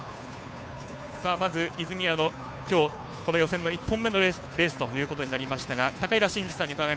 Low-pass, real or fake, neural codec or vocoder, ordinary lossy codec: none; fake; codec, 16 kHz, 2 kbps, FunCodec, trained on Chinese and English, 25 frames a second; none